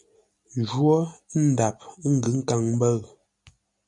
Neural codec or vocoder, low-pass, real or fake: none; 10.8 kHz; real